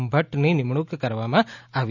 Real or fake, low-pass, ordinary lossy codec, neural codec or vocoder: real; 7.2 kHz; none; none